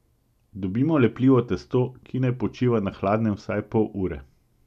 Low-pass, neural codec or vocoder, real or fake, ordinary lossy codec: 14.4 kHz; none; real; none